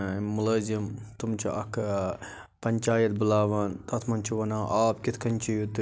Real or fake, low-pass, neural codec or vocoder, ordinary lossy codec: real; none; none; none